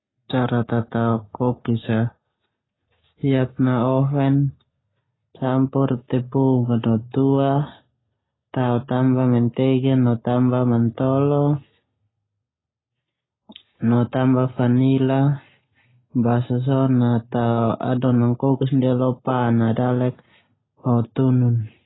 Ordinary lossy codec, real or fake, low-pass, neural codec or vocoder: AAC, 16 kbps; real; 7.2 kHz; none